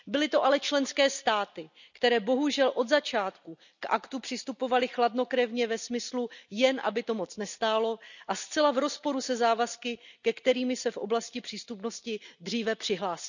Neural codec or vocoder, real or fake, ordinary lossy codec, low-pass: none; real; none; 7.2 kHz